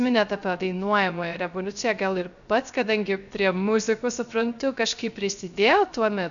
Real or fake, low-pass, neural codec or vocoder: fake; 7.2 kHz; codec, 16 kHz, 0.3 kbps, FocalCodec